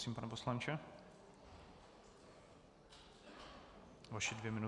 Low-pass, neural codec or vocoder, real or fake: 10.8 kHz; none; real